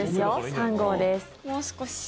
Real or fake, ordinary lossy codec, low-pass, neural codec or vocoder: real; none; none; none